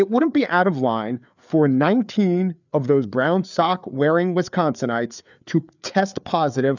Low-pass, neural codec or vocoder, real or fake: 7.2 kHz; codec, 16 kHz, 4 kbps, FreqCodec, larger model; fake